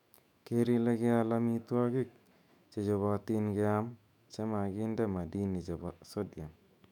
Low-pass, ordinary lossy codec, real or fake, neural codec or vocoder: 19.8 kHz; none; fake; autoencoder, 48 kHz, 128 numbers a frame, DAC-VAE, trained on Japanese speech